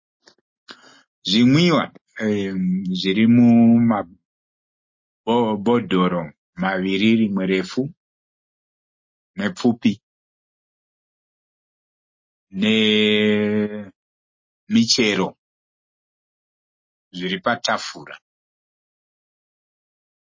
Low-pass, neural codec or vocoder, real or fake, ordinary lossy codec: 7.2 kHz; none; real; MP3, 32 kbps